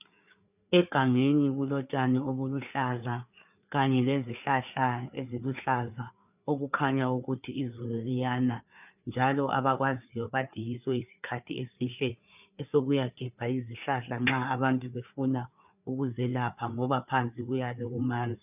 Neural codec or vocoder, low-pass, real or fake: codec, 16 kHz, 4 kbps, FreqCodec, larger model; 3.6 kHz; fake